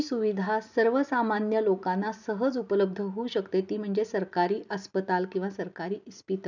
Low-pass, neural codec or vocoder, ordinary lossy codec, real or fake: 7.2 kHz; none; none; real